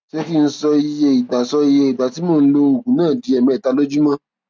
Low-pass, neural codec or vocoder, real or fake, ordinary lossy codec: none; none; real; none